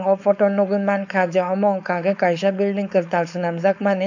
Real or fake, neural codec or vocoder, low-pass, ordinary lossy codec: fake; codec, 16 kHz, 4.8 kbps, FACodec; 7.2 kHz; none